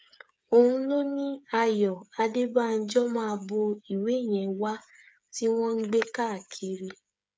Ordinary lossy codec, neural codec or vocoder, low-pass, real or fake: none; codec, 16 kHz, 8 kbps, FreqCodec, smaller model; none; fake